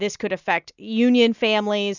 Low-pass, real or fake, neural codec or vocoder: 7.2 kHz; real; none